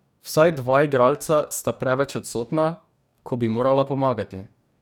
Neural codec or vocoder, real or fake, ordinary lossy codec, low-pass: codec, 44.1 kHz, 2.6 kbps, DAC; fake; none; 19.8 kHz